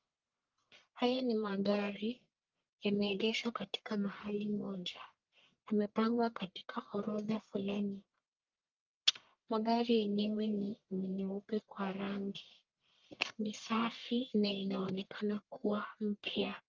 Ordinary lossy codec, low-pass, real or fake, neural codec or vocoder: Opus, 24 kbps; 7.2 kHz; fake; codec, 44.1 kHz, 1.7 kbps, Pupu-Codec